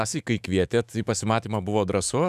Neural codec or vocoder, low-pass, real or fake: autoencoder, 48 kHz, 128 numbers a frame, DAC-VAE, trained on Japanese speech; 14.4 kHz; fake